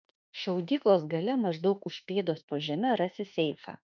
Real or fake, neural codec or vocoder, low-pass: fake; autoencoder, 48 kHz, 32 numbers a frame, DAC-VAE, trained on Japanese speech; 7.2 kHz